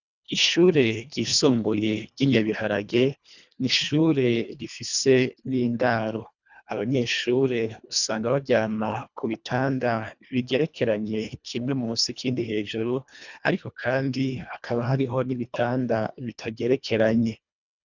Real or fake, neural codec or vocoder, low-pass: fake; codec, 24 kHz, 1.5 kbps, HILCodec; 7.2 kHz